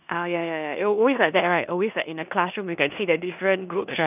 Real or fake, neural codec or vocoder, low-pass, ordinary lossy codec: fake; codec, 16 kHz in and 24 kHz out, 0.9 kbps, LongCat-Audio-Codec, four codebook decoder; 3.6 kHz; none